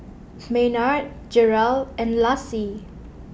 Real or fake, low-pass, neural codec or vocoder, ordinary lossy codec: real; none; none; none